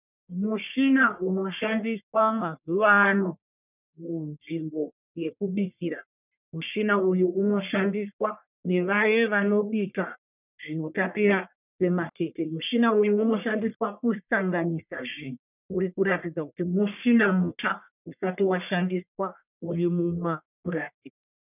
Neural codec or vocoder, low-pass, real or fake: codec, 44.1 kHz, 1.7 kbps, Pupu-Codec; 3.6 kHz; fake